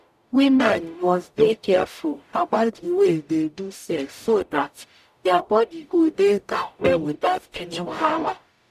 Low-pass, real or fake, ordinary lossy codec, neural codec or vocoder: 14.4 kHz; fake; none; codec, 44.1 kHz, 0.9 kbps, DAC